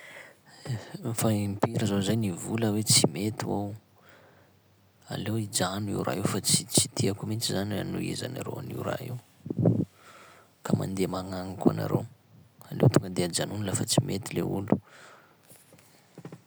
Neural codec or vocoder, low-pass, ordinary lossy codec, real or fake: none; none; none; real